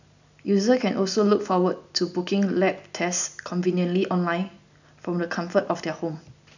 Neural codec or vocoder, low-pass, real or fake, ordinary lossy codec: none; 7.2 kHz; real; none